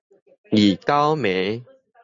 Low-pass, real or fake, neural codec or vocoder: 7.2 kHz; real; none